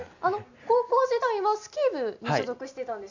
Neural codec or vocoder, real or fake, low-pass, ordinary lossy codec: none; real; 7.2 kHz; none